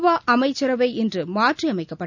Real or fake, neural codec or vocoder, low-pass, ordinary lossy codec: real; none; 7.2 kHz; none